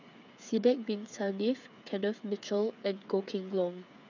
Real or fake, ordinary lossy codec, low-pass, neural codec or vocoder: fake; none; 7.2 kHz; codec, 16 kHz, 8 kbps, FreqCodec, smaller model